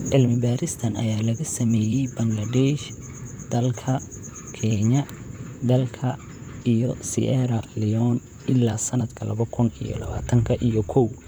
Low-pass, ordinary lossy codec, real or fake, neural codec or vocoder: none; none; fake; vocoder, 44.1 kHz, 128 mel bands, Pupu-Vocoder